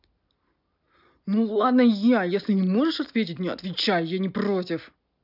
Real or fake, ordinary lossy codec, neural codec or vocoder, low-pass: real; none; none; 5.4 kHz